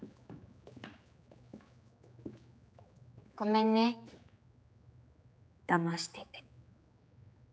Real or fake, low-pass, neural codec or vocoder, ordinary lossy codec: fake; none; codec, 16 kHz, 4 kbps, X-Codec, HuBERT features, trained on general audio; none